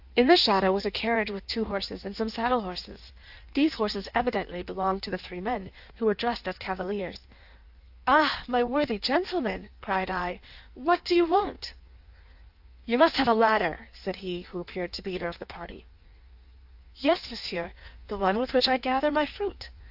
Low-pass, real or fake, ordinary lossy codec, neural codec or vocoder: 5.4 kHz; fake; AAC, 48 kbps; codec, 16 kHz in and 24 kHz out, 1.1 kbps, FireRedTTS-2 codec